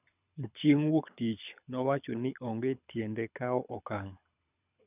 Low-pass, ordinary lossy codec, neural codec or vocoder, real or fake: 3.6 kHz; none; codec, 24 kHz, 6 kbps, HILCodec; fake